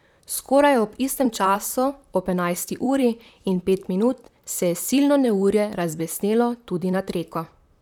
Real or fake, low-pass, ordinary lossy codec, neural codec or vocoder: fake; 19.8 kHz; none; vocoder, 44.1 kHz, 128 mel bands, Pupu-Vocoder